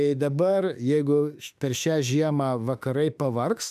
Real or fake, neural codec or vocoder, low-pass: fake; autoencoder, 48 kHz, 32 numbers a frame, DAC-VAE, trained on Japanese speech; 14.4 kHz